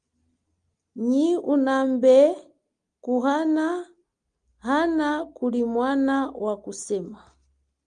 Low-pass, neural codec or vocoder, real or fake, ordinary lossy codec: 9.9 kHz; none; real; Opus, 32 kbps